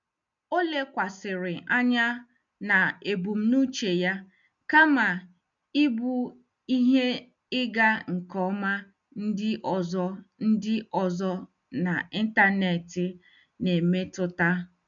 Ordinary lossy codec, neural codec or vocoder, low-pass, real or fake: MP3, 64 kbps; none; 7.2 kHz; real